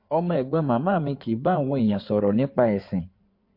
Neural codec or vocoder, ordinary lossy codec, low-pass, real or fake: codec, 16 kHz in and 24 kHz out, 2.2 kbps, FireRedTTS-2 codec; MP3, 32 kbps; 5.4 kHz; fake